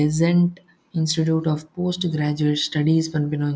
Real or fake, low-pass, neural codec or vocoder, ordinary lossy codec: real; none; none; none